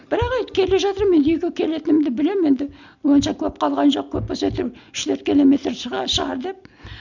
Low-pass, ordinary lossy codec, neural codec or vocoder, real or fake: 7.2 kHz; none; none; real